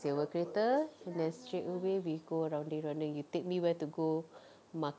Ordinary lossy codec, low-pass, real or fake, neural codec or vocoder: none; none; real; none